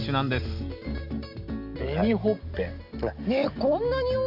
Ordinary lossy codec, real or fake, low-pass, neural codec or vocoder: none; real; 5.4 kHz; none